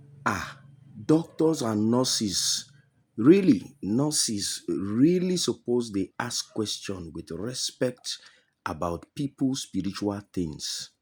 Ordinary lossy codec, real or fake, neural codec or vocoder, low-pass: none; real; none; none